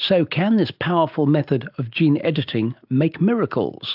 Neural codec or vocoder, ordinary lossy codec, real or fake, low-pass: none; AAC, 48 kbps; real; 5.4 kHz